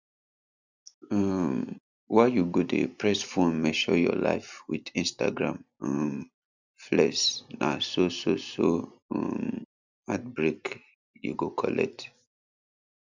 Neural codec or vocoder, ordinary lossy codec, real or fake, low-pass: none; none; real; 7.2 kHz